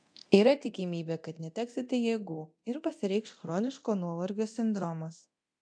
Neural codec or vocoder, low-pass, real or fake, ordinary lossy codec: codec, 24 kHz, 0.9 kbps, DualCodec; 9.9 kHz; fake; AAC, 64 kbps